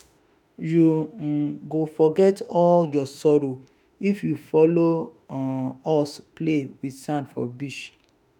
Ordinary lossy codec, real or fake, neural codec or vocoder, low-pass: none; fake; autoencoder, 48 kHz, 32 numbers a frame, DAC-VAE, trained on Japanese speech; 19.8 kHz